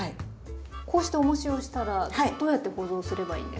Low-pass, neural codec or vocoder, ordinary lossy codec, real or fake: none; none; none; real